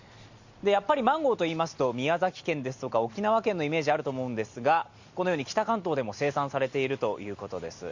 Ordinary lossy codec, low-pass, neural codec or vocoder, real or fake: Opus, 64 kbps; 7.2 kHz; none; real